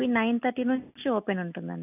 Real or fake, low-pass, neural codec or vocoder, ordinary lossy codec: real; 3.6 kHz; none; none